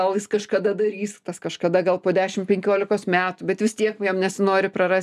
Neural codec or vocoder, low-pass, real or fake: none; 14.4 kHz; real